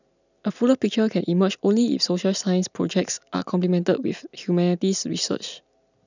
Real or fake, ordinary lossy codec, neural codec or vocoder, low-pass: real; none; none; 7.2 kHz